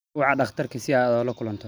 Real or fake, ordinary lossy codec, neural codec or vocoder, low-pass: real; none; none; none